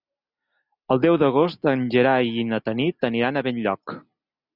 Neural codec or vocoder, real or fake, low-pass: none; real; 5.4 kHz